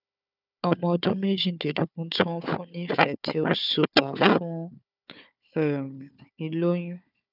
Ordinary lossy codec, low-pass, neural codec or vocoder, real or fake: none; 5.4 kHz; codec, 16 kHz, 4 kbps, FunCodec, trained on Chinese and English, 50 frames a second; fake